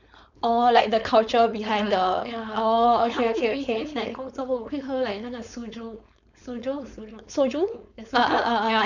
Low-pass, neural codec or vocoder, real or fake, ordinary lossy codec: 7.2 kHz; codec, 16 kHz, 4.8 kbps, FACodec; fake; none